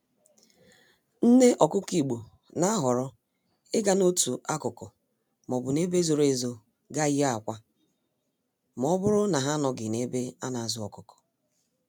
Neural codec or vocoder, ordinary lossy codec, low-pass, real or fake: none; none; none; real